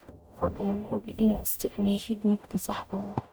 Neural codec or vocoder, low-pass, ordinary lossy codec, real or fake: codec, 44.1 kHz, 0.9 kbps, DAC; none; none; fake